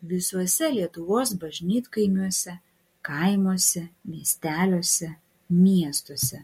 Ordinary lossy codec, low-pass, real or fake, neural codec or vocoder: MP3, 64 kbps; 19.8 kHz; real; none